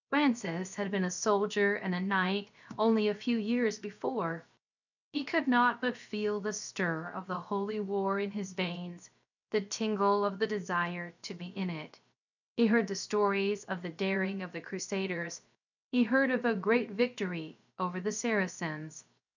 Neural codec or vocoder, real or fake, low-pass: codec, 16 kHz, 0.7 kbps, FocalCodec; fake; 7.2 kHz